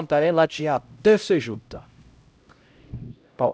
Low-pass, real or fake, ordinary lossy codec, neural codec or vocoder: none; fake; none; codec, 16 kHz, 0.5 kbps, X-Codec, HuBERT features, trained on LibriSpeech